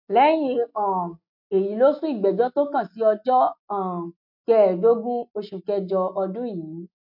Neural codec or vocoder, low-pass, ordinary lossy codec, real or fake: none; 5.4 kHz; MP3, 48 kbps; real